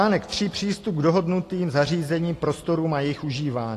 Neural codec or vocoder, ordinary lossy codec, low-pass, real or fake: none; AAC, 48 kbps; 14.4 kHz; real